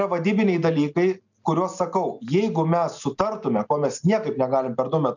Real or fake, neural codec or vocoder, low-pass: real; none; 7.2 kHz